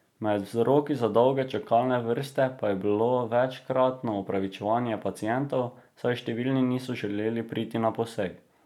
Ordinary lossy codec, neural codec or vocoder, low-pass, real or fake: none; none; 19.8 kHz; real